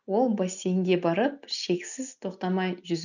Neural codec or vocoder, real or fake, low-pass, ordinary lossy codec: none; real; 7.2 kHz; none